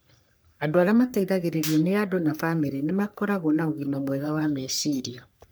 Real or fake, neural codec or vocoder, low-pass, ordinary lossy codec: fake; codec, 44.1 kHz, 3.4 kbps, Pupu-Codec; none; none